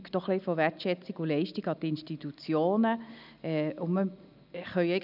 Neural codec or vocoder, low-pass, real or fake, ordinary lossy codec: none; 5.4 kHz; real; none